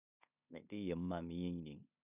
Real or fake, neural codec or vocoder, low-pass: fake; codec, 16 kHz in and 24 kHz out, 0.9 kbps, LongCat-Audio-Codec, four codebook decoder; 3.6 kHz